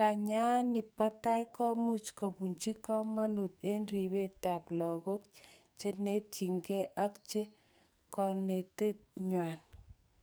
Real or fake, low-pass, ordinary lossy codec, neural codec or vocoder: fake; none; none; codec, 44.1 kHz, 2.6 kbps, SNAC